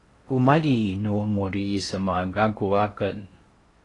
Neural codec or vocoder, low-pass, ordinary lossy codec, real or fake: codec, 16 kHz in and 24 kHz out, 0.6 kbps, FocalCodec, streaming, 4096 codes; 10.8 kHz; AAC, 32 kbps; fake